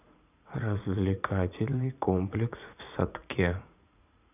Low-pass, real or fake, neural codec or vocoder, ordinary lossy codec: 3.6 kHz; fake; vocoder, 44.1 kHz, 80 mel bands, Vocos; none